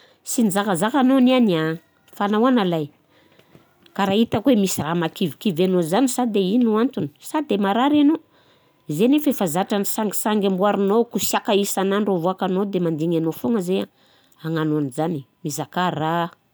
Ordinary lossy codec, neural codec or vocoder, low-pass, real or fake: none; none; none; real